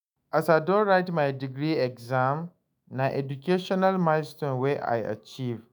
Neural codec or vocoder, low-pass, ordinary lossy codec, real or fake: autoencoder, 48 kHz, 128 numbers a frame, DAC-VAE, trained on Japanese speech; none; none; fake